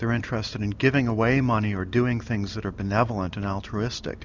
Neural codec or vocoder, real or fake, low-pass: vocoder, 44.1 kHz, 128 mel bands every 256 samples, BigVGAN v2; fake; 7.2 kHz